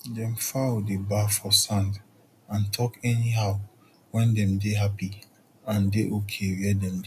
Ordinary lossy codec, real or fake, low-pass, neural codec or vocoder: none; real; 14.4 kHz; none